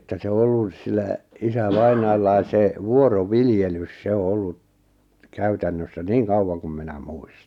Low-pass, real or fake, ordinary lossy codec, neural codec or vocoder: 19.8 kHz; real; none; none